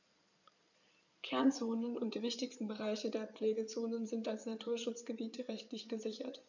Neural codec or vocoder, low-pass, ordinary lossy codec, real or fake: codec, 16 kHz in and 24 kHz out, 2.2 kbps, FireRedTTS-2 codec; 7.2 kHz; none; fake